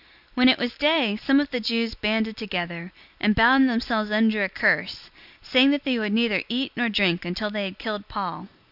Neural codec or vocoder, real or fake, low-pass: none; real; 5.4 kHz